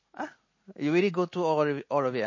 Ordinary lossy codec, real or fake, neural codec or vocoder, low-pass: MP3, 32 kbps; real; none; 7.2 kHz